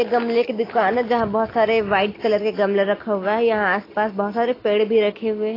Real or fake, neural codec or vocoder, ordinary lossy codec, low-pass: real; none; AAC, 24 kbps; 5.4 kHz